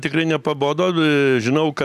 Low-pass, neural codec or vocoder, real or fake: 14.4 kHz; vocoder, 44.1 kHz, 128 mel bands every 256 samples, BigVGAN v2; fake